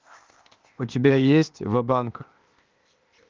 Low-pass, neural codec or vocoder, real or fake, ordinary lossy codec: 7.2 kHz; codec, 16 kHz, 0.8 kbps, ZipCodec; fake; Opus, 24 kbps